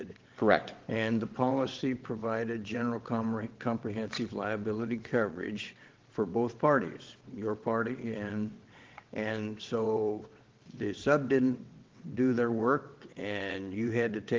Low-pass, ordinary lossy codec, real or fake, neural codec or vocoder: 7.2 kHz; Opus, 16 kbps; fake; vocoder, 22.05 kHz, 80 mel bands, WaveNeXt